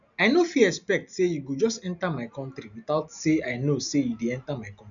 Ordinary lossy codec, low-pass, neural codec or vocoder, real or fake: none; 7.2 kHz; none; real